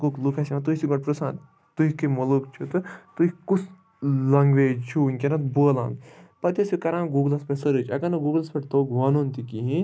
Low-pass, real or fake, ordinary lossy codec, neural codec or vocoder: none; real; none; none